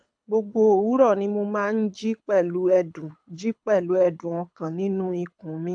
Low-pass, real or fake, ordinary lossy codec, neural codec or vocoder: 9.9 kHz; fake; AAC, 64 kbps; codec, 24 kHz, 6 kbps, HILCodec